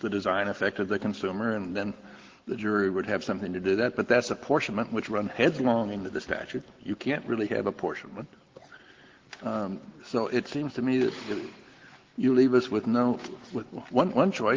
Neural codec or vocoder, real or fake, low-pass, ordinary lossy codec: none; real; 7.2 kHz; Opus, 16 kbps